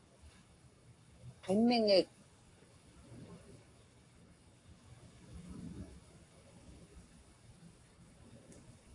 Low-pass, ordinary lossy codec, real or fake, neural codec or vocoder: 10.8 kHz; AAC, 48 kbps; fake; codec, 44.1 kHz, 7.8 kbps, Pupu-Codec